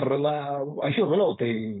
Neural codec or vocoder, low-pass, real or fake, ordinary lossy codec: codec, 16 kHz, 4.8 kbps, FACodec; 7.2 kHz; fake; AAC, 16 kbps